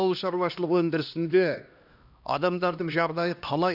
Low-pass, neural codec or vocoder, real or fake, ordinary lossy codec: 5.4 kHz; codec, 16 kHz, 1 kbps, X-Codec, HuBERT features, trained on LibriSpeech; fake; none